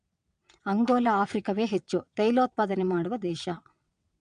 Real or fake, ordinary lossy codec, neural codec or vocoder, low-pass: fake; AAC, 96 kbps; vocoder, 22.05 kHz, 80 mel bands, WaveNeXt; 9.9 kHz